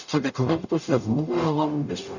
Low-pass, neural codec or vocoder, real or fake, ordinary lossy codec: 7.2 kHz; codec, 44.1 kHz, 0.9 kbps, DAC; fake; none